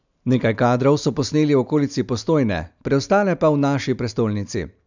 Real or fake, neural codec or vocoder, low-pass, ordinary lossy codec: real; none; 7.2 kHz; none